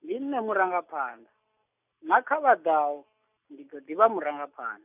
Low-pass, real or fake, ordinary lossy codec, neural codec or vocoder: 3.6 kHz; real; none; none